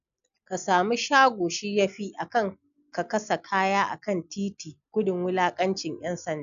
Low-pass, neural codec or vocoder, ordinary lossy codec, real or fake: 7.2 kHz; none; none; real